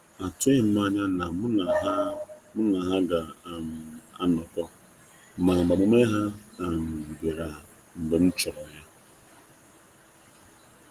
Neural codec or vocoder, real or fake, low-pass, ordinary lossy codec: none; real; 14.4 kHz; Opus, 24 kbps